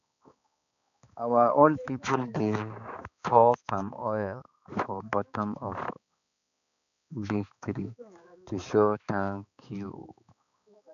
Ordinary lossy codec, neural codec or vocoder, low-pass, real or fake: none; codec, 16 kHz, 4 kbps, X-Codec, HuBERT features, trained on balanced general audio; 7.2 kHz; fake